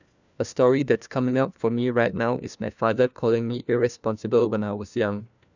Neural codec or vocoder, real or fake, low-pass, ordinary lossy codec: codec, 16 kHz, 1 kbps, FunCodec, trained on LibriTTS, 50 frames a second; fake; 7.2 kHz; none